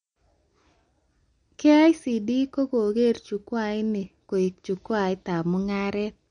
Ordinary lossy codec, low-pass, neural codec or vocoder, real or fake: MP3, 48 kbps; 9.9 kHz; none; real